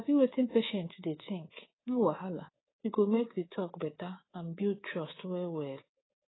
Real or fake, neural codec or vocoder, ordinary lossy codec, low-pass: fake; codec, 24 kHz, 3.1 kbps, DualCodec; AAC, 16 kbps; 7.2 kHz